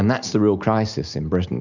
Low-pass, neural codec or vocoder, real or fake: 7.2 kHz; none; real